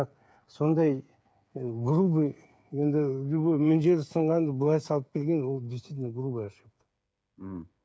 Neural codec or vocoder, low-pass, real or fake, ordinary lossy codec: codec, 16 kHz, 8 kbps, FreqCodec, smaller model; none; fake; none